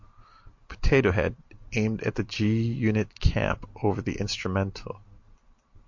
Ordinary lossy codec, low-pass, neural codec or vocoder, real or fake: MP3, 48 kbps; 7.2 kHz; none; real